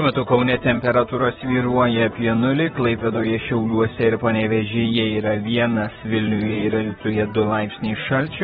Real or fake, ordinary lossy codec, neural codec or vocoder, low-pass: fake; AAC, 16 kbps; vocoder, 44.1 kHz, 128 mel bands, Pupu-Vocoder; 19.8 kHz